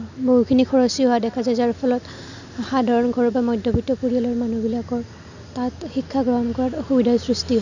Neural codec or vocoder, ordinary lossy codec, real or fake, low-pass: none; none; real; 7.2 kHz